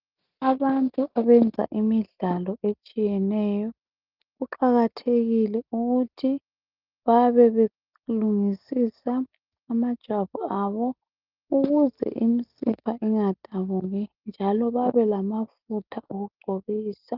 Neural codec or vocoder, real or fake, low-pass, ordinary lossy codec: none; real; 5.4 kHz; Opus, 32 kbps